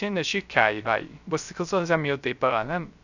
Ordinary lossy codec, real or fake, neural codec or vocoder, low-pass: none; fake; codec, 16 kHz, 0.3 kbps, FocalCodec; 7.2 kHz